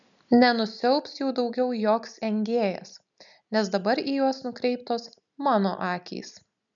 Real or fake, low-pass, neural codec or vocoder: real; 7.2 kHz; none